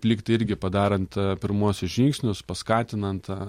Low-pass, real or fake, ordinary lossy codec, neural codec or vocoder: 19.8 kHz; real; MP3, 64 kbps; none